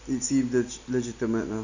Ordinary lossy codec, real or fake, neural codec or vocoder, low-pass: none; real; none; 7.2 kHz